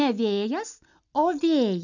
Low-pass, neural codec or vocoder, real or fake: 7.2 kHz; none; real